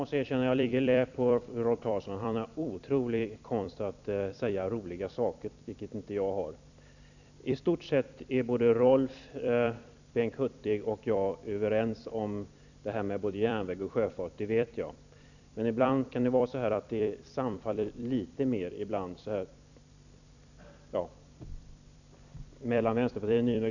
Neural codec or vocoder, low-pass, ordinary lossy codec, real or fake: vocoder, 44.1 kHz, 128 mel bands every 256 samples, BigVGAN v2; 7.2 kHz; none; fake